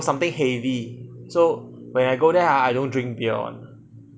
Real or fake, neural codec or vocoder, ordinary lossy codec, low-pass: real; none; none; none